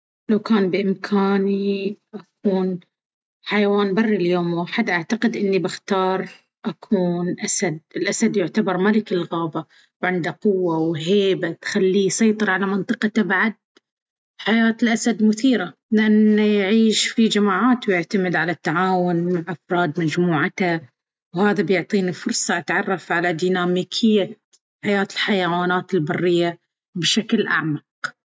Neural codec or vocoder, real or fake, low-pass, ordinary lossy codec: none; real; none; none